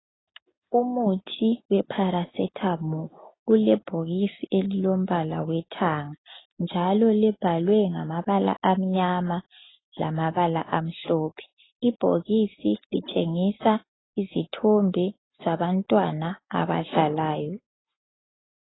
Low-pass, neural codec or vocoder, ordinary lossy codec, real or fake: 7.2 kHz; none; AAC, 16 kbps; real